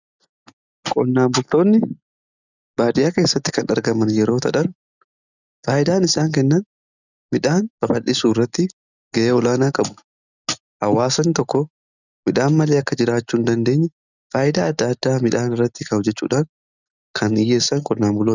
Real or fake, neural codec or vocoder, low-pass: real; none; 7.2 kHz